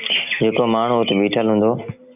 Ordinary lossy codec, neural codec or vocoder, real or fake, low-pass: AAC, 32 kbps; none; real; 3.6 kHz